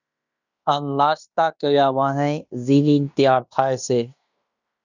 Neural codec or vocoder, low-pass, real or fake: codec, 16 kHz in and 24 kHz out, 0.9 kbps, LongCat-Audio-Codec, fine tuned four codebook decoder; 7.2 kHz; fake